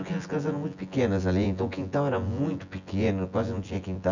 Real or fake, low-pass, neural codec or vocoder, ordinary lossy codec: fake; 7.2 kHz; vocoder, 24 kHz, 100 mel bands, Vocos; none